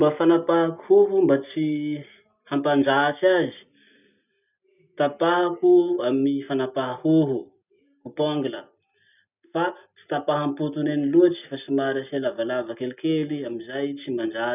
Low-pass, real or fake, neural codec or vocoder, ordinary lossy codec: 3.6 kHz; real; none; none